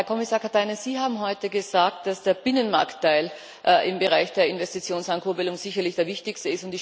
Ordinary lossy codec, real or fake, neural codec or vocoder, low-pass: none; real; none; none